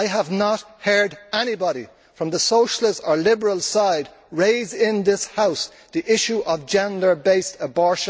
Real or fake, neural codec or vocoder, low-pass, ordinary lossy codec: real; none; none; none